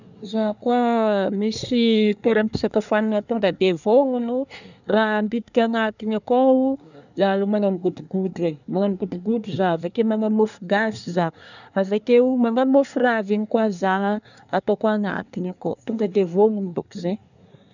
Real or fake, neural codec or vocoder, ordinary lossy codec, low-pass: fake; codec, 24 kHz, 1 kbps, SNAC; none; 7.2 kHz